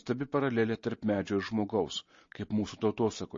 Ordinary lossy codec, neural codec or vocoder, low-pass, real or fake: MP3, 32 kbps; none; 7.2 kHz; real